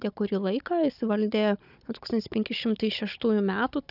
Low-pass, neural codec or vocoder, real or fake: 5.4 kHz; codec, 16 kHz, 16 kbps, FunCodec, trained on Chinese and English, 50 frames a second; fake